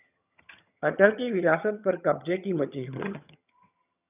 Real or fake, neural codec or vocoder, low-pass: fake; vocoder, 22.05 kHz, 80 mel bands, HiFi-GAN; 3.6 kHz